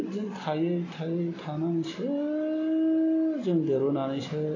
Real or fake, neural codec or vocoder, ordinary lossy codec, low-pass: real; none; AAC, 32 kbps; 7.2 kHz